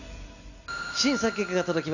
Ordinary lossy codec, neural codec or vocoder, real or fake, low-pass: none; none; real; 7.2 kHz